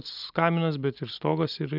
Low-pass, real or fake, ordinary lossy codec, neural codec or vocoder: 5.4 kHz; real; Opus, 24 kbps; none